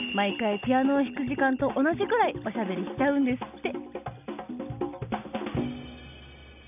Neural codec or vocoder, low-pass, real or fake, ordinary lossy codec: none; 3.6 kHz; real; none